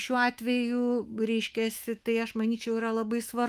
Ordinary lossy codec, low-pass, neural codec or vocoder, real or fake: Opus, 32 kbps; 14.4 kHz; autoencoder, 48 kHz, 128 numbers a frame, DAC-VAE, trained on Japanese speech; fake